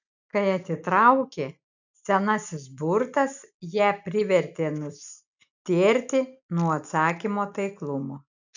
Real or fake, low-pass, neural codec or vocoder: real; 7.2 kHz; none